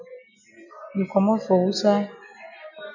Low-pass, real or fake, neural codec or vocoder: 7.2 kHz; real; none